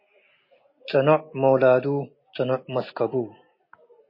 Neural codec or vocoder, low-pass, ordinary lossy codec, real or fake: none; 5.4 kHz; MP3, 24 kbps; real